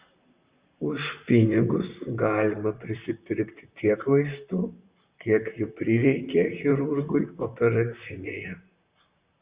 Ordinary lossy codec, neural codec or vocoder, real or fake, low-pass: Opus, 64 kbps; vocoder, 44.1 kHz, 128 mel bands, Pupu-Vocoder; fake; 3.6 kHz